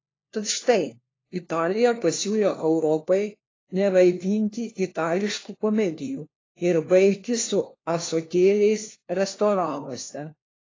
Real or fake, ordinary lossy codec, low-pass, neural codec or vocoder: fake; AAC, 32 kbps; 7.2 kHz; codec, 16 kHz, 1 kbps, FunCodec, trained on LibriTTS, 50 frames a second